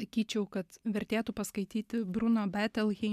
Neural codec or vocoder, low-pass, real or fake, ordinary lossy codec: none; 14.4 kHz; real; MP3, 96 kbps